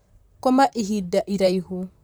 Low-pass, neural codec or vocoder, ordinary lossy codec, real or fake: none; vocoder, 44.1 kHz, 128 mel bands, Pupu-Vocoder; none; fake